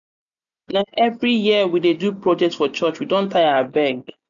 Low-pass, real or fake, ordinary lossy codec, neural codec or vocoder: 7.2 kHz; real; none; none